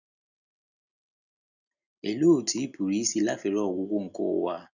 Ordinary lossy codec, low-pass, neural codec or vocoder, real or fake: none; 7.2 kHz; none; real